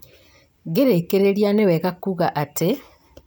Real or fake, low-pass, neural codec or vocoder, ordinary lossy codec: real; none; none; none